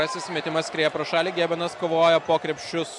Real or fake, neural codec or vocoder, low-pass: real; none; 10.8 kHz